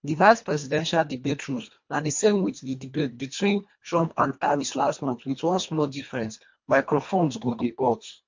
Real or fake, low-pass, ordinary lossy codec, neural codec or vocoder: fake; 7.2 kHz; MP3, 48 kbps; codec, 24 kHz, 1.5 kbps, HILCodec